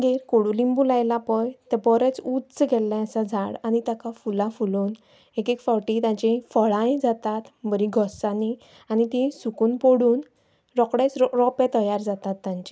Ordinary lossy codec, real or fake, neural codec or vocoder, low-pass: none; real; none; none